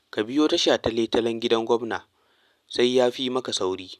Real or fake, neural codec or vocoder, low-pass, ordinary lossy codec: real; none; 14.4 kHz; none